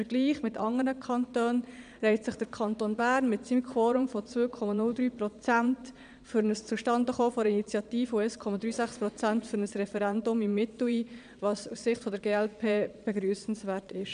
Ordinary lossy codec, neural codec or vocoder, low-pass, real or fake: none; vocoder, 22.05 kHz, 80 mel bands, Vocos; 9.9 kHz; fake